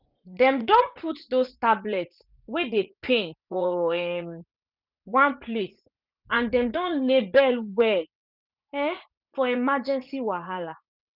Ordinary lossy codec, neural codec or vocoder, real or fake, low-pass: none; vocoder, 44.1 kHz, 80 mel bands, Vocos; fake; 5.4 kHz